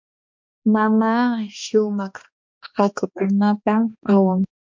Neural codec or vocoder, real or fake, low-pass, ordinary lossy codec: codec, 16 kHz, 2 kbps, X-Codec, HuBERT features, trained on balanced general audio; fake; 7.2 kHz; MP3, 48 kbps